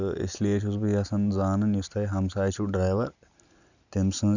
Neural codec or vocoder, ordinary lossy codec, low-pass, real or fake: none; none; 7.2 kHz; real